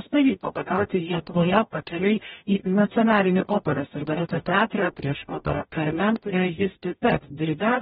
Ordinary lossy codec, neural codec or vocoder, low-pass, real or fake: AAC, 16 kbps; codec, 44.1 kHz, 0.9 kbps, DAC; 19.8 kHz; fake